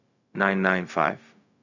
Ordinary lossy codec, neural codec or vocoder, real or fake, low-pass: none; codec, 16 kHz, 0.4 kbps, LongCat-Audio-Codec; fake; 7.2 kHz